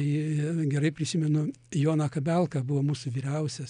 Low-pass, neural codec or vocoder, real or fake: 9.9 kHz; none; real